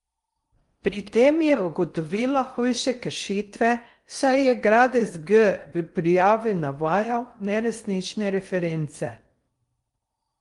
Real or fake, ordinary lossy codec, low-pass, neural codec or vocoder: fake; Opus, 32 kbps; 10.8 kHz; codec, 16 kHz in and 24 kHz out, 0.6 kbps, FocalCodec, streaming, 4096 codes